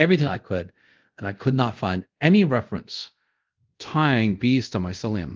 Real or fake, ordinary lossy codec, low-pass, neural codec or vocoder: fake; Opus, 32 kbps; 7.2 kHz; codec, 24 kHz, 0.5 kbps, DualCodec